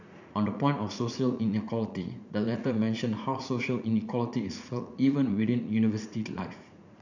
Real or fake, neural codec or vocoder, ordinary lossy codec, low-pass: fake; vocoder, 44.1 kHz, 80 mel bands, Vocos; none; 7.2 kHz